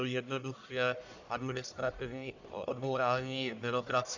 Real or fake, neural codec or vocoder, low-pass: fake; codec, 44.1 kHz, 1.7 kbps, Pupu-Codec; 7.2 kHz